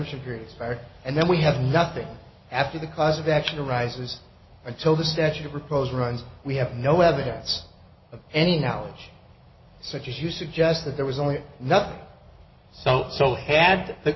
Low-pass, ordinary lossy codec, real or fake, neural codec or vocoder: 7.2 kHz; MP3, 24 kbps; real; none